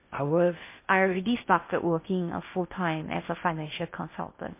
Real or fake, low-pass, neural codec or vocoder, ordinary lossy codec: fake; 3.6 kHz; codec, 16 kHz in and 24 kHz out, 0.6 kbps, FocalCodec, streaming, 2048 codes; MP3, 24 kbps